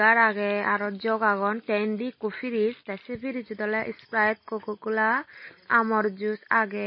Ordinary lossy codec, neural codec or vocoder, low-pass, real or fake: MP3, 24 kbps; none; 7.2 kHz; real